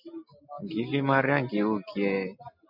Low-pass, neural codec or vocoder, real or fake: 5.4 kHz; none; real